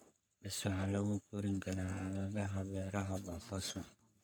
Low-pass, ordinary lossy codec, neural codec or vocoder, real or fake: none; none; codec, 44.1 kHz, 3.4 kbps, Pupu-Codec; fake